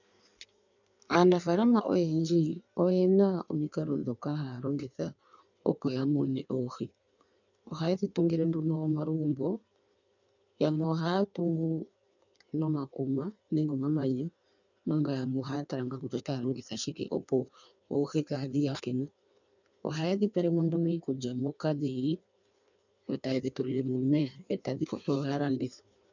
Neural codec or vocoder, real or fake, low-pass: codec, 16 kHz in and 24 kHz out, 1.1 kbps, FireRedTTS-2 codec; fake; 7.2 kHz